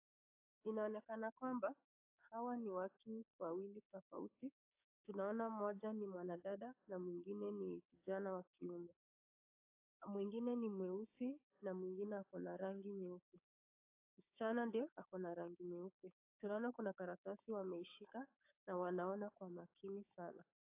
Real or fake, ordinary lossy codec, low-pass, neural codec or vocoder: fake; AAC, 32 kbps; 3.6 kHz; codec, 16 kHz, 6 kbps, DAC